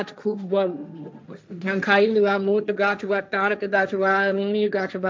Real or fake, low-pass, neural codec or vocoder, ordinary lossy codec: fake; none; codec, 16 kHz, 1.1 kbps, Voila-Tokenizer; none